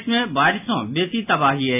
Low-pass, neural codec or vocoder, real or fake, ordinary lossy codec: 3.6 kHz; none; real; AAC, 32 kbps